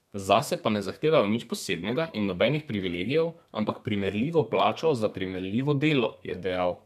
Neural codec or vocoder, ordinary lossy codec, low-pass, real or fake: codec, 32 kHz, 1.9 kbps, SNAC; none; 14.4 kHz; fake